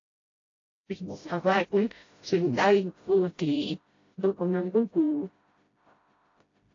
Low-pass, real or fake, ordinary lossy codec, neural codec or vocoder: 7.2 kHz; fake; AAC, 32 kbps; codec, 16 kHz, 0.5 kbps, FreqCodec, smaller model